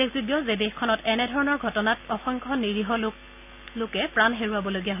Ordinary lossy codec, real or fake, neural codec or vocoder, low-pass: none; real; none; 3.6 kHz